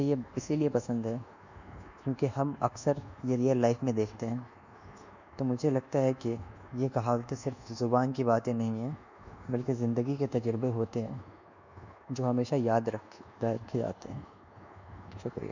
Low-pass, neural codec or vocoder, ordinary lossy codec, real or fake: 7.2 kHz; codec, 24 kHz, 1.2 kbps, DualCodec; none; fake